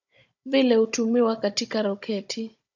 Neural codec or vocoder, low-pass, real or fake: codec, 16 kHz, 16 kbps, FunCodec, trained on Chinese and English, 50 frames a second; 7.2 kHz; fake